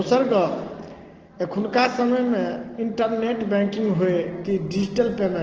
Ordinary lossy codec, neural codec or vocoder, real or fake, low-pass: Opus, 16 kbps; none; real; 7.2 kHz